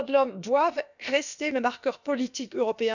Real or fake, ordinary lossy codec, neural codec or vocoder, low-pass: fake; none; codec, 16 kHz, about 1 kbps, DyCAST, with the encoder's durations; 7.2 kHz